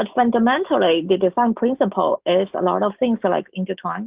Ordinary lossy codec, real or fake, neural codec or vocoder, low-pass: Opus, 32 kbps; fake; autoencoder, 48 kHz, 128 numbers a frame, DAC-VAE, trained on Japanese speech; 3.6 kHz